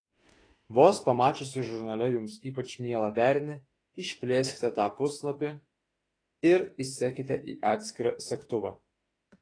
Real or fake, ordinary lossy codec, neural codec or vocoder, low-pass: fake; AAC, 32 kbps; autoencoder, 48 kHz, 32 numbers a frame, DAC-VAE, trained on Japanese speech; 9.9 kHz